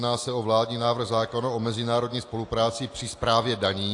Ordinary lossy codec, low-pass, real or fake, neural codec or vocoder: AAC, 48 kbps; 10.8 kHz; real; none